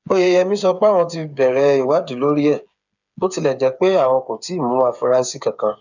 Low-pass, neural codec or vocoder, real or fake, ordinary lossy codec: 7.2 kHz; codec, 16 kHz, 8 kbps, FreqCodec, smaller model; fake; none